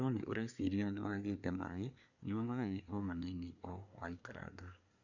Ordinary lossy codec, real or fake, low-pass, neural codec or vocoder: none; fake; 7.2 kHz; codec, 24 kHz, 1 kbps, SNAC